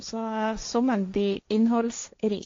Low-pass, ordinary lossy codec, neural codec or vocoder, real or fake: 7.2 kHz; AAC, 48 kbps; codec, 16 kHz, 1.1 kbps, Voila-Tokenizer; fake